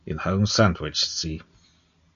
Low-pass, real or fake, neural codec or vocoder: 7.2 kHz; real; none